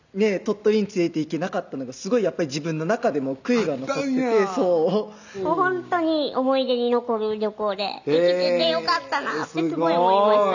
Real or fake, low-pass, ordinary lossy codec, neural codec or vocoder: real; 7.2 kHz; none; none